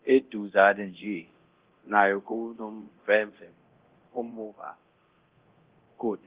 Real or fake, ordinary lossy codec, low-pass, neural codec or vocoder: fake; Opus, 16 kbps; 3.6 kHz; codec, 24 kHz, 0.9 kbps, DualCodec